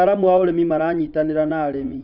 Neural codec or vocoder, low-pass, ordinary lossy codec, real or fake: vocoder, 44.1 kHz, 80 mel bands, Vocos; 5.4 kHz; none; fake